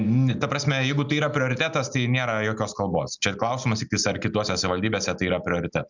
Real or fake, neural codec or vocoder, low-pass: real; none; 7.2 kHz